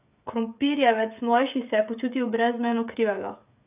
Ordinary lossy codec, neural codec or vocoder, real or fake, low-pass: none; codec, 16 kHz, 16 kbps, FreqCodec, smaller model; fake; 3.6 kHz